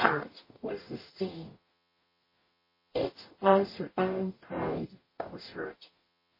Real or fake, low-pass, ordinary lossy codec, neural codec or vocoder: fake; 5.4 kHz; MP3, 24 kbps; codec, 44.1 kHz, 0.9 kbps, DAC